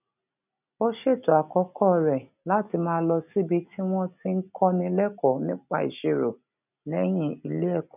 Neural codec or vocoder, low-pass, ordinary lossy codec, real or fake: none; 3.6 kHz; none; real